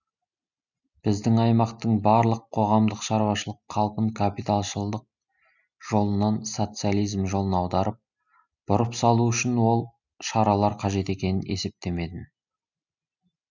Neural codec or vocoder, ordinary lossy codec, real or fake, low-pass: none; none; real; 7.2 kHz